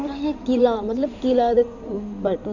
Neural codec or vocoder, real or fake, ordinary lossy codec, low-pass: codec, 16 kHz in and 24 kHz out, 2.2 kbps, FireRedTTS-2 codec; fake; none; 7.2 kHz